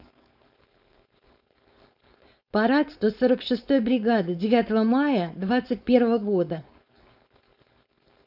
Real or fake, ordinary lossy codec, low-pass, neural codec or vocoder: fake; none; 5.4 kHz; codec, 16 kHz, 4.8 kbps, FACodec